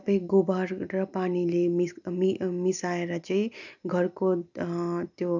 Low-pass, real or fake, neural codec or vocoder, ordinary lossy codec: 7.2 kHz; real; none; none